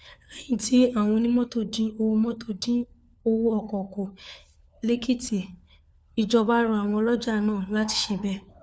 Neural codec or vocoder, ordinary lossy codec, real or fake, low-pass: codec, 16 kHz, 4 kbps, FunCodec, trained on LibriTTS, 50 frames a second; none; fake; none